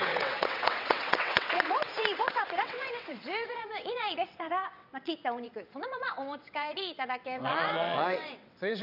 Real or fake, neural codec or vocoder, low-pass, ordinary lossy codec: fake; vocoder, 22.05 kHz, 80 mel bands, WaveNeXt; 5.4 kHz; none